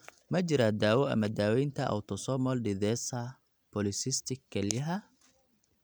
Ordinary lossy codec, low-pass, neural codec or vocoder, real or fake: none; none; none; real